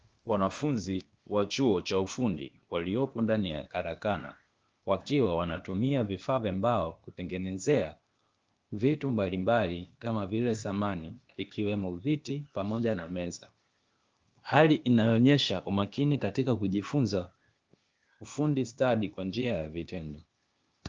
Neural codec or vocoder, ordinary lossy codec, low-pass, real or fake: codec, 16 kHz, 0.8 kbps, ZipCodec; Opus, 24 kbps; 7.2 kHz; fake